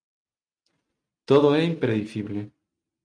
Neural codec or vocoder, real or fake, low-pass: none; real; 9.9 kHz